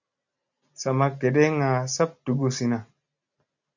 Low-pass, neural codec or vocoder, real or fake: 7.2 kHz; none; real